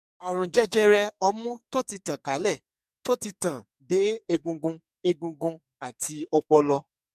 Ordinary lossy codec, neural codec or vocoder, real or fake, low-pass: MP3, 96 kbps; codec, 44.1 kHz, 2.6 kbps, SNAC; fake; 14.4 kHz